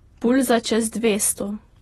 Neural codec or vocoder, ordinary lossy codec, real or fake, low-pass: vocoder, 48 kHz, 128 mel bands, Vocos; AAC, 32 kbps; fake; 19.8 kHz